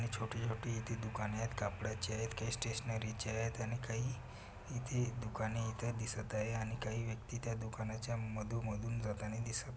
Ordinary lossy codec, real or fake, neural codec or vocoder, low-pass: none; real; none; none